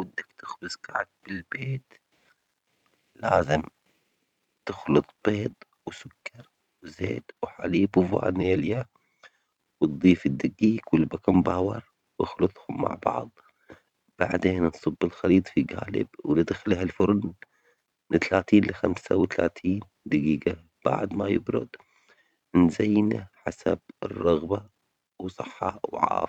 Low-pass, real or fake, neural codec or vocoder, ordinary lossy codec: 19.8 kHz; fake; vocoder, 44.1 kHz, 128 mel bands every 512 samples, BigVGAN v2; none